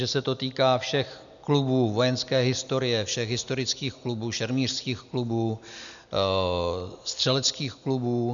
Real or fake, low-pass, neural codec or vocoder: real; 7.2 kHz; none